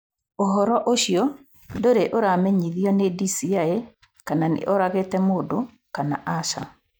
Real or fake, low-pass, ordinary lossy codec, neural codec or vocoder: real; none; none; none